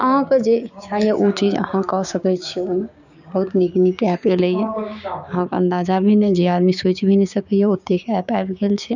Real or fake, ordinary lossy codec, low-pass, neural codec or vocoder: fake; none; 7.2 kHz; codec, 16 kHz, 6 kbps, DAC